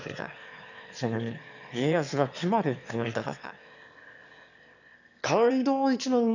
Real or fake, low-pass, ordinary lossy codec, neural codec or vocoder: fake; 7.2 kHz; none; autoencoder, 22.05 kHz, a latent of 192 numbers a frame, VITS, trained on one speaker